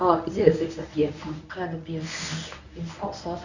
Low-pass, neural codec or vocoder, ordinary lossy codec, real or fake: 7.2 kHz; codec, 24 kHz, 0.9 kbps, WavTokenizer, medium speech release version 1; none; fake